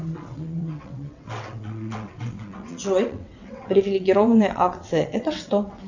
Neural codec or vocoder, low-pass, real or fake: vocoder, 22.05 kHz, 80 mel bands, WaveNeXt; 7.2 kHz; fake